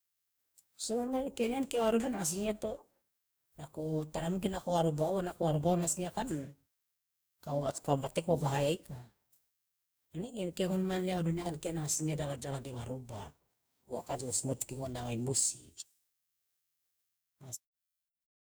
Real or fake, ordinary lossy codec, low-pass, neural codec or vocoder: fake; none; none; codec, 44.1 kHz, 2.6 kbps, DAC